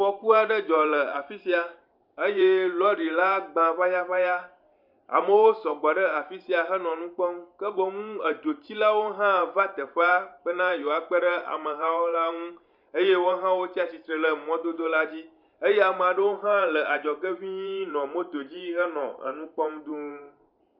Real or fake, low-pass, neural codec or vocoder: fake; 5.4 kHz; vocoder, 44.1 kHz, 128 mel bands every 512 samples, BigVGAN v2